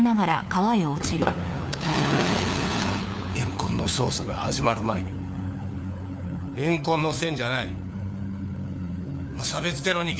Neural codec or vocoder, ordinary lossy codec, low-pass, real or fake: codec, 16 kHz, 4 kbps, FunCodec, trained on LibriTTS, 50 frames a second; none; none; fake